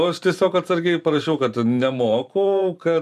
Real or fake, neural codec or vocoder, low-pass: fake; vocoder, 44.1 kHz, 128 mel bands every 512 samples, BigVGAN v2; 14.4 kHz